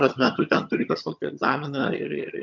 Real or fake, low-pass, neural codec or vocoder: fake; 7.2 kHz; vocoder, 22.05 kHz, 80 mel bands, HiFi-GAN